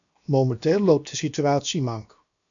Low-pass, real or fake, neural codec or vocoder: 7.2 kHz; fake; codec, 16 kHz, 0.7 kbps, FocalCodec